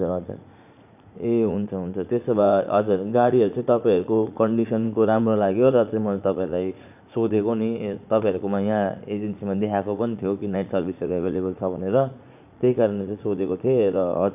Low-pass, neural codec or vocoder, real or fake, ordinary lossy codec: 3.6 kHz; vocoder, 44.1 kHz, 80 mel bands, Vocos; fake; none